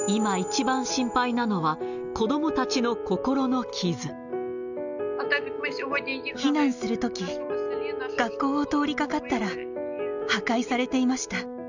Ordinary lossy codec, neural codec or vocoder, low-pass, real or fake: none; none; 7.2 kHz; real